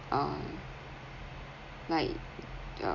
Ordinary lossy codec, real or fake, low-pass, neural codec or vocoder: none; real; 7.2 kHz; none